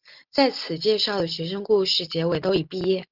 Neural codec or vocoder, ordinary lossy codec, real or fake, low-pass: none; Opus, 32 kbps; real; 5.4 kHz